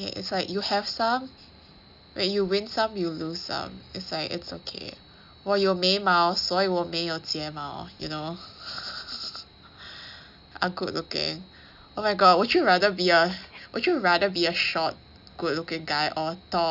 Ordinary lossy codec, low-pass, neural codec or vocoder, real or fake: none; 5.4 kHz; none; real